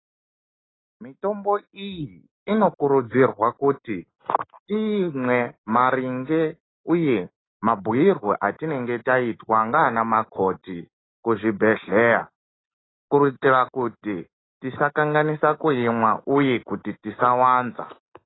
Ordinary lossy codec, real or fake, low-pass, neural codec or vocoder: AAC, 16 kbps; real; 7.2 kHz; none